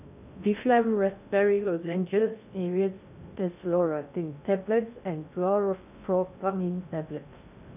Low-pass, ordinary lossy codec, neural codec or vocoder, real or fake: 3.6 kHz; none; codec, 16 kHz in and 24 kHz out, 0.6 kbps, FocalCodec, streaming, 2048 codes; fake